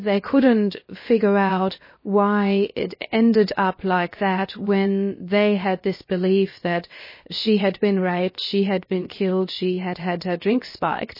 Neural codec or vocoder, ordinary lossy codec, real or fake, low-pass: codec, 16 kHz, 0.7 kbps, FocalCodec; MP3, 24 kbps; fake; 5.4 kHz